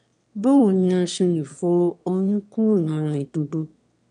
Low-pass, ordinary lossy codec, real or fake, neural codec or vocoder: 9.9 kHz; none; fake; autoencoder, 22.05 kHz, a latent of 192 numbers a frame, VITS, trained on one speaker